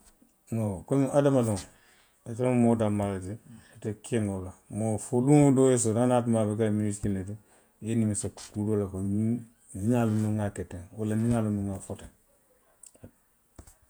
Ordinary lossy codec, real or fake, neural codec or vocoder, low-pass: none; fake; vocoder, 48 kHz, 128 mel bands, Vocos; none